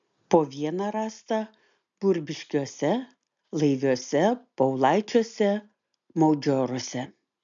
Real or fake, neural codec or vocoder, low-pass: real; none; 7.2 kHz